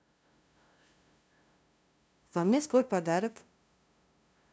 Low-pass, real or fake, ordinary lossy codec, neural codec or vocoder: none; fake; none; codec, 16 kHz, 0.5 kbps, FunCodec, trained on LibriTTS, 25 frames a second